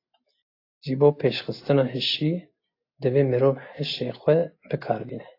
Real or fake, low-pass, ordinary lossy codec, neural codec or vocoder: real; 5.4 kHz; AAC, 32 kbps; none